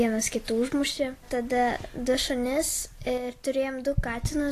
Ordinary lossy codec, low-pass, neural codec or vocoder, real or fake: AAC, 48 kbps; 14.4 kHz; none; real